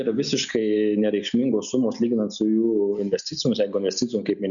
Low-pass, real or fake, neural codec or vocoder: 7.2 kHz; real; none